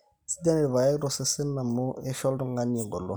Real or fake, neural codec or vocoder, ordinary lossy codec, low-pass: real; none; none; none